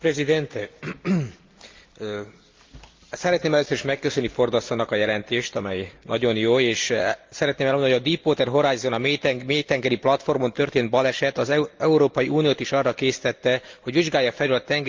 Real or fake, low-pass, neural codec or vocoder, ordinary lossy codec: real; 7.2 kHz; none; Opus, 24 kbps